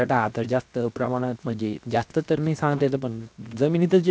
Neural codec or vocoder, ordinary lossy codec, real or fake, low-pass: codec, 16 kHz, 0.7 kbps, FocalCodec; none; fake; none